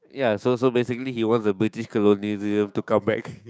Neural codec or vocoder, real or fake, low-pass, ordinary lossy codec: codec, 16 kHz, 6 kbps, DAC; fake; none; none